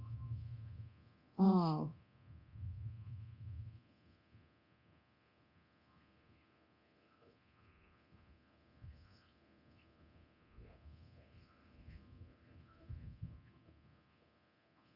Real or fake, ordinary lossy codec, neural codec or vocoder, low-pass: fake; Opus, 64 kbps; codec, 24 kHz, 0.9 kbps, DualCodec; 5.4 kHz